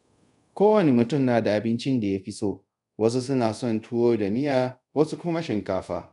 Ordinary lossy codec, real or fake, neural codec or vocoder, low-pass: none; fake; codec, 24 kHz, 0.5 kbps, DualCodec; 10.8 kHz